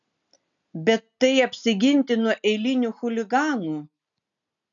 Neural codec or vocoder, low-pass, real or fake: none; 7.2 kHz; real